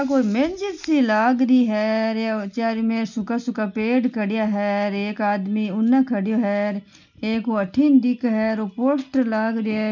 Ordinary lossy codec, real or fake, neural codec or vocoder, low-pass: none; real; none; 7.2 kHz